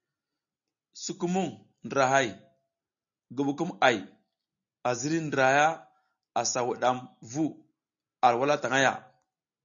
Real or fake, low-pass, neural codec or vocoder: real; 7.2 kHz; none